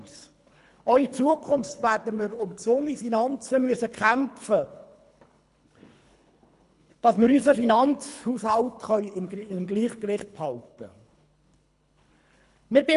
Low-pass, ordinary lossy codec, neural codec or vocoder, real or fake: 10.8 kHz; none; codec, 24 kHz, 3 kbps, HILCodec; fake